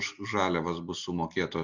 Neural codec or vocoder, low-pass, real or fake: none; 7.2 kHz; real